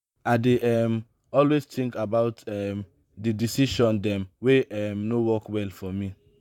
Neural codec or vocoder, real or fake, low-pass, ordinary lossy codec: vocoder, 44.1 kHz, 128 mel bands, Pupu-Vocoder; fake; 19.8 kHz; none